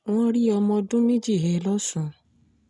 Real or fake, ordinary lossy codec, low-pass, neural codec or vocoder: real; none; 10.8 kHz; none